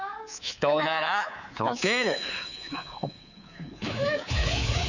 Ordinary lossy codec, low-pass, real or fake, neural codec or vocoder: none; 7.2 kHz; fake; codec, 24 kHz, 3.1 kbps, DualCodec